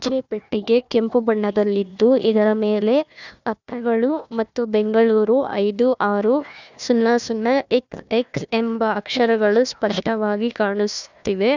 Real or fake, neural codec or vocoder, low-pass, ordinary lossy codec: fake; codec, 16 kHz, 1 kbps, FunCodec, trained on Chinese and English, 50 frames a second; 7.2 kHz; none